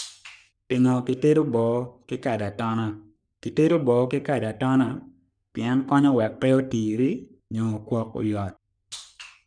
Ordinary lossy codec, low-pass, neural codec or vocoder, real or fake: none; 9.9 kHz; codec, 44.1 kHz, 3.4 kbps, Pupu-Codec; fake